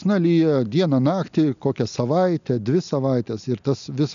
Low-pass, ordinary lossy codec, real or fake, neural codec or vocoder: 7.2 kHz; MP3, 96 kbps; real; none